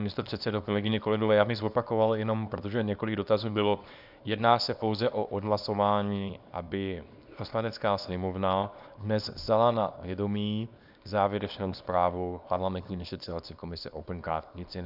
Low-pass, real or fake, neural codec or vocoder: 5.4 kHz; fake; codec, 24 kHz, 0.9 kbps, WavTokenizer, small release